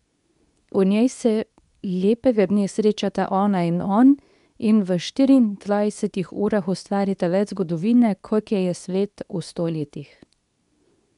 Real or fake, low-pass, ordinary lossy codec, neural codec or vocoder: fake; 10.8 kHz; none; codec, 24 kHz, 0.9 kbps, WavTokenizer, medium speech release version 2